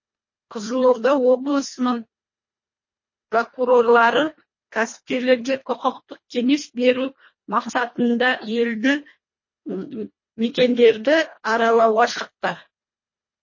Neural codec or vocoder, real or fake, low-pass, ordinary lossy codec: codec, 24 kHz, 1.5 kbps, HILCodec; fake; 7.2 kHz; MP3, 32 kbps